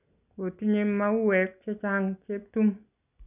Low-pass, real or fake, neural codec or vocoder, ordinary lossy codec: 3.6 kHz; real; none; none